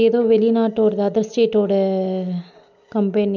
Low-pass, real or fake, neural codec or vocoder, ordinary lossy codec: 7.2 kHz; real; none; none